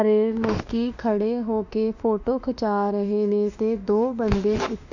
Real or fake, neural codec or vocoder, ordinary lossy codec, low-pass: fake; autoencoder, 48 kHz, 32 numbers a frame, DAC-VAE, trained on Japanese speech; none; 7.2 kHz